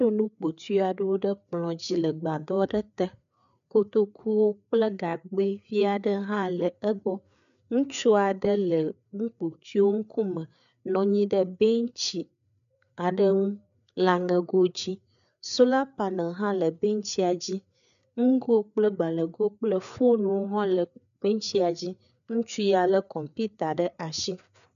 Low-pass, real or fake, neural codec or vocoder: 7.2 kHz; fake; codec, 16 kHz, 4 kbps, FreqCodec, larger model